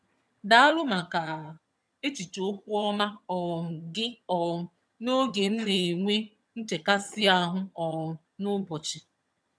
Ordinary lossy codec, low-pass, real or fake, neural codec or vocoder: none; none; fake; vocoder, 22.05 kHz, 80 mel bands, HiFi-GAN